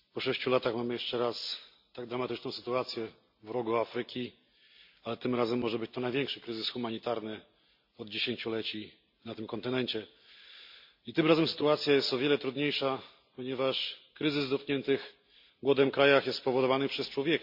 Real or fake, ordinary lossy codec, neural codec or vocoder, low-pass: real; MP3, 48 kbps; none; 5.4 kHz